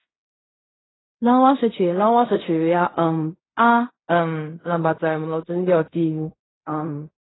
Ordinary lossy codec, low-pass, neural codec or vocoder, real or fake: AAC, 16 kbps; 7.2 kHz; codec, 16 kHz in and 24 kHz out, 0.4 kbps, LongCat-Audio-Codec, fine tuned four codebook decoder; fake